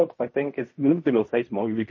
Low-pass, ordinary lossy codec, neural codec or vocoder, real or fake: 7.2 kHz; MP3, 32 kbps; codec, 16 kHz in and 24 kHz out, 0.4 kbps, LongCat-Audio-Codec, fine tuned four codebook decoder; fake